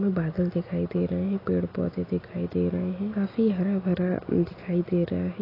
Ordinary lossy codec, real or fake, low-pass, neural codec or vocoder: AAC, 24 kbps; real; 5.4 kHz; none